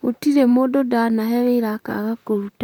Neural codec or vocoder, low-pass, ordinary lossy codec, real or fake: vocoder, 44.1 kHz, 128 mel bands, Pupu-Vocoder; 19.8 kHz; none; fake